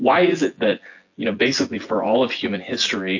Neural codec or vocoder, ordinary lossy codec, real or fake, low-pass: vocoder, 24 kHz, 100 mel bands, Vocos; AAC, 48 kbps; fake; 7.2 kHz